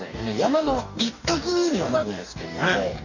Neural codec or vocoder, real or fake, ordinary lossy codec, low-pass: codec, 44.1 kHz, 2.6 kbps, DAC; fake; none; 7.2 kHz